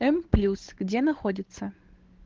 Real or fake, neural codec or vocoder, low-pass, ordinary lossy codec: fake; codec, 16 kHz, 8 kbps, FunCodec, trained on Chinese and English, 25 frames a second; 7.2 kHz; Opus, 16 kbps